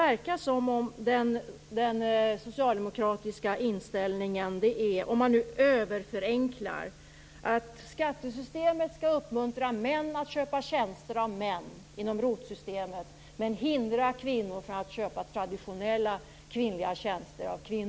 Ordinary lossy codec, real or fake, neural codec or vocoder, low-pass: none; real; none; none